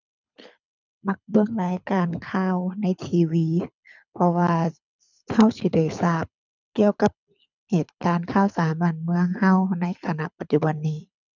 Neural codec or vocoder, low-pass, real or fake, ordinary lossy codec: codec, 24 kHz, 6 kbps, HILCodec; 7.2 kHz; fake; none